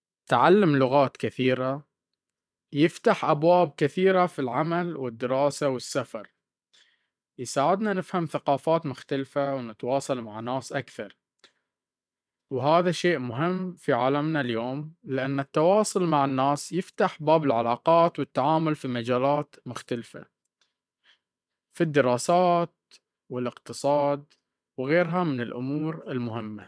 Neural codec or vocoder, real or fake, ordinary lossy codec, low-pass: vocoder, 22.05 kHz, 80 mel bands, WaveNeXt; fake; none; none